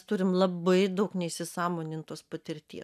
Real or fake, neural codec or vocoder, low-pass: real; none; 14.4 kHz